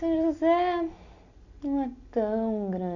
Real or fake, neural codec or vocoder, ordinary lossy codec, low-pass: real; none; none; 7.2 kHz